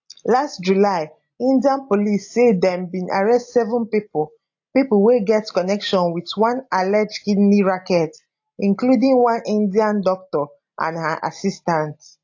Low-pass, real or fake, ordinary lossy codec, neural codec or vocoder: 7.2 kHz; real; AAC, 48 kbps; none